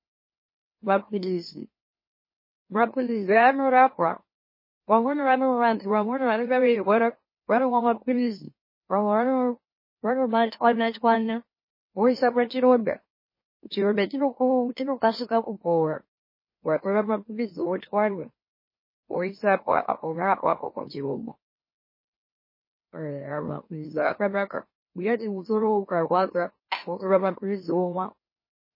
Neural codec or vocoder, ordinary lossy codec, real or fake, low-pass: autoencoder, 44.1 kHz, a latent of 192 numbers a frame, MeloTTS; MP3, 24 kbps; fake; 5.4 kHz